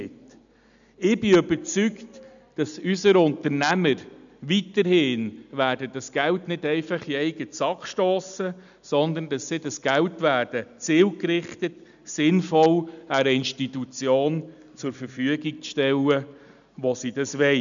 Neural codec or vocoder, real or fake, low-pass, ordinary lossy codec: none; real; 7.2 kHz; none